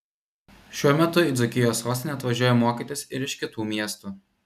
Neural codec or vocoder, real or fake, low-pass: none; real; 14.4 kHz